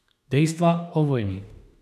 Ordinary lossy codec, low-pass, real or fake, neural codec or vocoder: none; 14.4 kHz; fake; autoencoder, 48 kHz, 32 numbers a frame, DAC-VAE, trained on Japanese speech